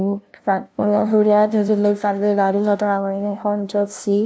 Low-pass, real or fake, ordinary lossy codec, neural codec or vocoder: none; fake; none; codec, 16 kHz, 0.5 kbps, FunCodec, trained on LibriTTS, 25 frames a second